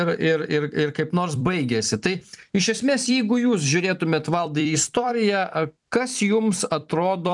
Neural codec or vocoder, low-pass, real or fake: vocoder, 44.1 kHz, 128 mel bands every 256 samples, BigVGAN v2; 10.8 kHz; fake